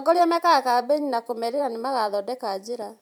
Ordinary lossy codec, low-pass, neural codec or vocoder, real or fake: none; 19.8 kHz; vocoder, 44.1 kHz, 128 mel bands every 512 samples, BigVGAN v2; fake